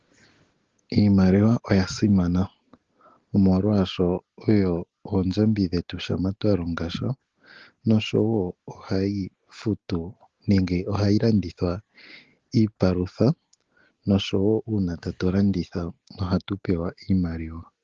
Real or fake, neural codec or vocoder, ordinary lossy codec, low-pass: real; none; Opus, 16 kbps; 7.2 kHz